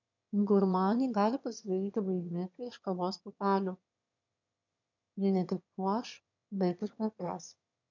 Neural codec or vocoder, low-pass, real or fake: autoencoder, 22.05 kHz, a latent of 192 numbers a frame, VITS, trained on one speaker; 7.2 kHz; fake